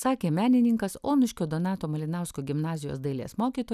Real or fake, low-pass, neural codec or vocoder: real; 14.4 kHz; none